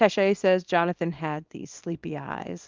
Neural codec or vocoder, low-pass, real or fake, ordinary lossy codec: codec, 24 kHz, 0.9 kbps, WavTokenizer, small release; 7.2 kHz; fake; Opus, 32 kbps